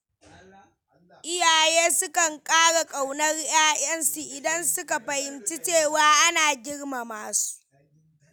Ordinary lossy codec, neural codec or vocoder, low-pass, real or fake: none; none; none; real